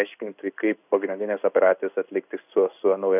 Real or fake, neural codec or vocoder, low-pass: fake; codec, 16 kHz in and 24 kHz out, 1 kbps, XY-Tokenizer; 3.6 kHz